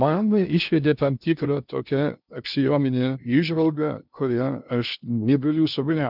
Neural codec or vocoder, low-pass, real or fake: codec, 16 kHz in and 24 kHz out, 0.6 kbps, FocalCodec, streaming, 2048 codes; 5.4 kHz; fake